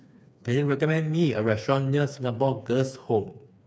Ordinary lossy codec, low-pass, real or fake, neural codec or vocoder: none; none; fake; codec, 16 kHz, 4 kbps, FreqCodec, smaller model